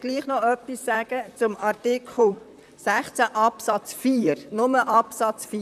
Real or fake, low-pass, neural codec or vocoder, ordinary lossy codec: fake; 14.4 kHz; vocoder, 44.1 kHz, 128 mel bands, Pupu-Vocoder; none